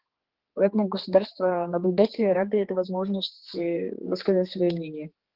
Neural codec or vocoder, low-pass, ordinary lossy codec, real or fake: codec, 16 kHz, 4 kbps, X-Codec, HuBERT features, trained on general audio; 5.4 kHz; Opus, 16 kbps; fake